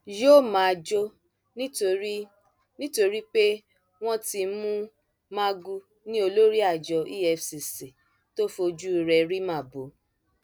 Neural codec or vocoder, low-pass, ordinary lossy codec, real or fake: none; none; none; real